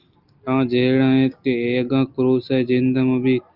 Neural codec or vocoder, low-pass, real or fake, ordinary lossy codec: none; 5.4 kHz; real; Opus, 24 kbps